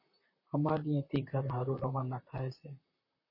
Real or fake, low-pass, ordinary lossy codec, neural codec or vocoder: fake; 5.4 kHz; MP3, 24 kbps; vocoder, 44.1 kHz, 128 mel bands, Pupu-Vocoder